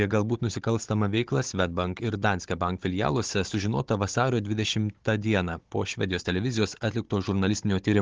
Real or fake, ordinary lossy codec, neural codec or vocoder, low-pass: fake; Opus, 16 kbps; codec, 16 kHz, 16 kbps, FunCodec, trained on Chinese and English, 50 frames a second; 7.2 kHz